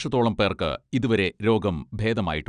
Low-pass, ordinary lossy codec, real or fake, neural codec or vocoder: 9.9 kHz; none; real; none